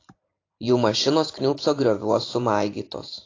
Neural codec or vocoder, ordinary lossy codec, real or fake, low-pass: vocoder, 44.1 kHz, 128 mel bands every 512 samples, BigVGAN v2; AAC, 32 kbps; fake; 7.2 kHz